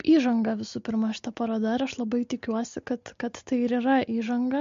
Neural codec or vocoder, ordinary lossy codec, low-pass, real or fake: none; MP3, 48 kbps; 7.2 kHz; real